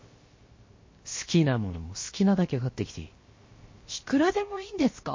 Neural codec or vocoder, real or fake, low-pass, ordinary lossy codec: codec, 16 kHz, 0.7 kbps, FocalCodec; fake; 7.2 kHz; MP3, 32 kbps